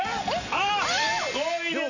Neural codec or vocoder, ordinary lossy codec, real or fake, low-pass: none; none; real; 7.2 kHz